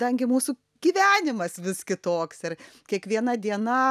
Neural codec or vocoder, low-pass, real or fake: none; 14.4 kHz; real